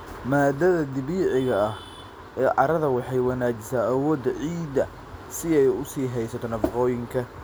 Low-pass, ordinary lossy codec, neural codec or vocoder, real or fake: none; none; none; real